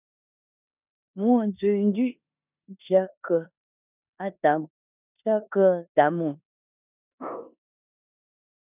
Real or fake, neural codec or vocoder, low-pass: fake; codec, 16 kHz in and 24 kHz out, 0.9 kbps, LongCat-Audio-Codec, four codebook decoder; 3.6 kHz